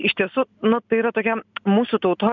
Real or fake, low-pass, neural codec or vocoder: real; 7.2 kHz; none